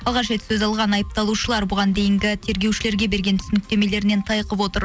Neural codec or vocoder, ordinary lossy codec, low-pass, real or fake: none; none; none; real